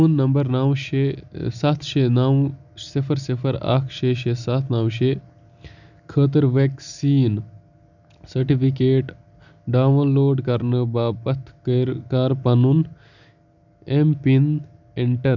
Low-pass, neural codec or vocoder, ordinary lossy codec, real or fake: 7.2 kHz; none; none; real